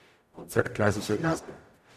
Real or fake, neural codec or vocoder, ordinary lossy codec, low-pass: fake; codec, 44.1 kHz, 0.9 kbps, DAC; none; 14.4 kHz